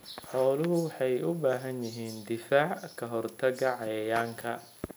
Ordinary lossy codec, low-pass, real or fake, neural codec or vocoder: none; none; real; none